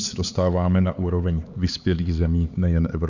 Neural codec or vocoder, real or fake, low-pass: codec, 16 kHz, 4 kbps, X-Codec, HuBERT features, trained on LibriSpeech; fake; 7.2 kHz